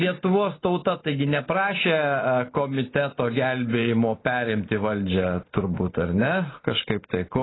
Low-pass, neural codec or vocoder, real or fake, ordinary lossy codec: 7.2 kHz; none; real; AAC, 16 kbps